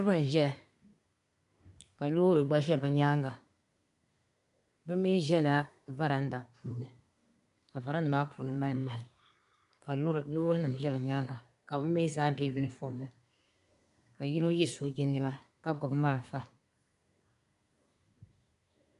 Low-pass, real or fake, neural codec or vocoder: 10.8 kHz; fake; codec, 24 kHz, 1 kbps, SNAC